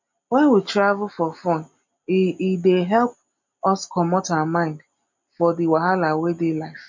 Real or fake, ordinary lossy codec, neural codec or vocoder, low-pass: real; MP3, 48 kbps; none; 7.2 kHz